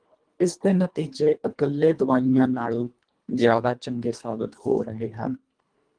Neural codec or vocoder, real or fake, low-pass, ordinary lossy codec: codec, 24 kHz, 1.5 kbps, HILCodec; fake; 9.9 kHz; Opus, 32 kbps